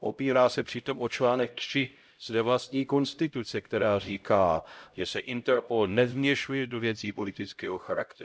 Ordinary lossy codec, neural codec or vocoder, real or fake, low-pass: none; codec, 16 kHz, 0.5 kbps, X-Codec, HuBERT features, trained on LibriSpeech; fake; none